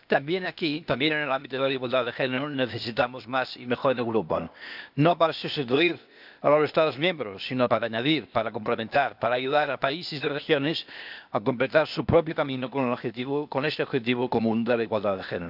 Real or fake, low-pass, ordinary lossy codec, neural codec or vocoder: fake; 5.4 kHz; none; codec, 16 kHz, 0.8 kbps, ZipCodec